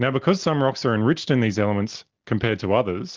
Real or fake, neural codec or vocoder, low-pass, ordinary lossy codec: real; none; 7.2 kHz; Opus, 16 kbps